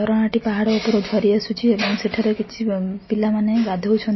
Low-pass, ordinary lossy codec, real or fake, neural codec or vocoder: 7.2 kHz; MP3, 24 kbps; real; none